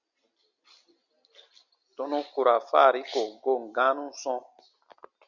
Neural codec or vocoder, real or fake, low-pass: none; real; 7.2 kHz